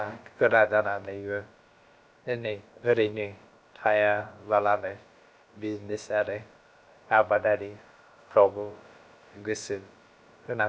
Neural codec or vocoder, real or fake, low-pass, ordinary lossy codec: codec, 16 kHz, about 1 kbps, DyCAST, with the encoder's durations; fake; none; none